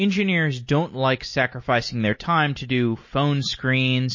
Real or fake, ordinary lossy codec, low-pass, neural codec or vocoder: real; MP3, 32 kbps; 7.2 kHz; none